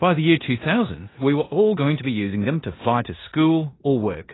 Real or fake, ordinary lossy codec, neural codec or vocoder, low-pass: fake; AAC, 16 kbps; codec, 16 kHz in and 24 kHz out, 0.9 kbps, LongCat-Audio-Codec, four codebook decoder; 7.2 kHz